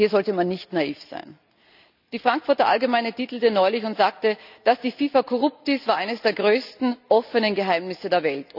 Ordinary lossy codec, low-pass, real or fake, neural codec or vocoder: none; 5.4 kHz; real; none